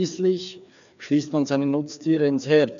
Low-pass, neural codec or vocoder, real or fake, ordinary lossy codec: 7.2 kHz; codec, 16 kHz, 2 kbps, FreqCodec, larger model; fake; none